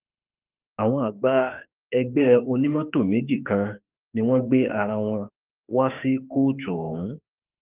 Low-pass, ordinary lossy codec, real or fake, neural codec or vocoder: 3.6 kHz; Opus, 32 kbps; fake; autoencoder, 48 kHz, 32 numbers a frame, DAC-VAE, trained on Japanese speech